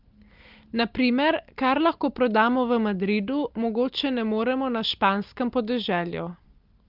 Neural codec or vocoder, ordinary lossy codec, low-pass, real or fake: none; Opus, 24 kbps; 5.4 kHz; real